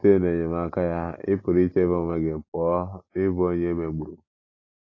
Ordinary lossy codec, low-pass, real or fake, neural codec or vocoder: AAC, 32 kbps; 7.2 kHz; real; none